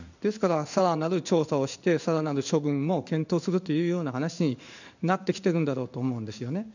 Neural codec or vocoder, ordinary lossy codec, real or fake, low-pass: codec, 16 kHz in and 24 kHz out, 1 kbps, XY-Tokenizer; none; fake; 7.2 kHz